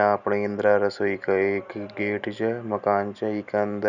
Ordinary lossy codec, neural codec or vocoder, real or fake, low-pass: none; none; real; 7.2 kHz